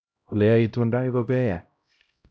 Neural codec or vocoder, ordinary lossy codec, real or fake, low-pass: codec, 16 kHz, 0.5 kbps, X-Codec, HuBERT features, trained on LibriSpeech; none; fake; none